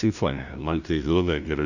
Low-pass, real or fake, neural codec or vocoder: 7.2 kHz; fake; codec, 16 kHz, 0.5 kbps, FunCodec, trained on LibriTTS, 25 frames a second